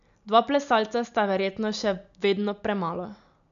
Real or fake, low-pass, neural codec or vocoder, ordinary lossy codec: real; 7.2 kHz; none; none